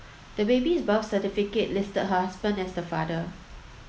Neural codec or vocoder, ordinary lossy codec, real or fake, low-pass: none; none; real; none